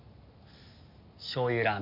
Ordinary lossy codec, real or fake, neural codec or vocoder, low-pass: AAC, 48 kbps; real; none; 5.4 kHz